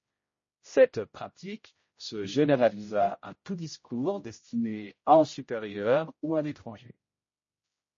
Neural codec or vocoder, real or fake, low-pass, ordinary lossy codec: codec, 16 kHz, 0.5 kbps, X-Codec, HuBERT features, trained on general audio; fake; 7.2 kHz; MP3, 32 kbps